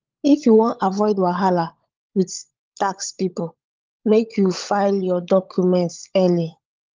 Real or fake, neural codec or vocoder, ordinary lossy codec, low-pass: fake; codec, 16 kHz, 16 kbps, FunCodec, trained on LibriTTS, 50 frames a second; Opus, 32 kbps; 7.2 kHz